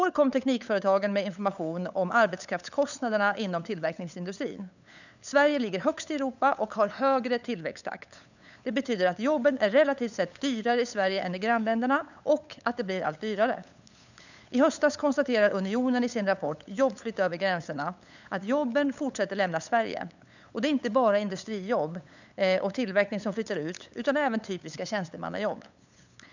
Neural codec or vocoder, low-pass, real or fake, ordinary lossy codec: codec, 16 kHz, 8 kbps, FunCodec, trained on LibriTTS, 25 frames a second; 7.2 kHz; fake; none